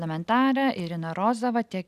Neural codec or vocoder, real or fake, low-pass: none; real; 14.4 kHz